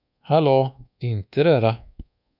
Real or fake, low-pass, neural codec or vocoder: fake; 5.4 kHz; codec, 24 kHz, 1.2 kbps, DualCodec